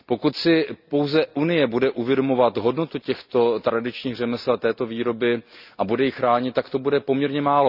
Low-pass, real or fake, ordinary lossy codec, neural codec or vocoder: 5.4 kHz; real; none; none